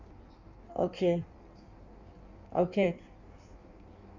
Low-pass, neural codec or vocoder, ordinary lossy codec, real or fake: 7.2 kHz; codec, 16 kHz in and 24 kHz out, 1.1 kbps, FireRedTTS-2 codec; none; fake